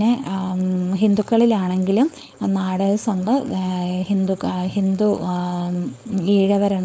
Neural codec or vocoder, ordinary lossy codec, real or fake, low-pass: codec, 16 kHz, 4.8 kbps, FACodec; none; fake; none